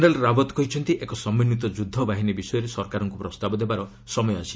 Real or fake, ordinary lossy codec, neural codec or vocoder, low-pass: real; none; none; none